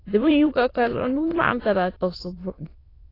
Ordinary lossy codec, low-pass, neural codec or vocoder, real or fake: AAC, 24 kbps; 5.4 kHz; autoencoder, 22.05 kHz, a latent of 192 numbers a frame, VITS, trained on many speakers; fake